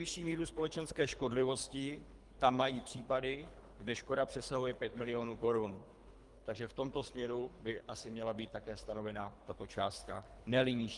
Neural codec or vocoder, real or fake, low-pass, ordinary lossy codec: codec, 24 kHz, 3 kbps, HILCodec; fake; 10.8 kHz; Opus, 32 kbps